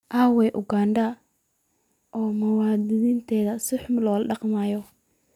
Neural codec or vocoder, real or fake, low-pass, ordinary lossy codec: none; real; 19.8 kHz; none